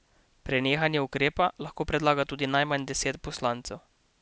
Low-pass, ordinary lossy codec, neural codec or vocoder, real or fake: none; none; none; real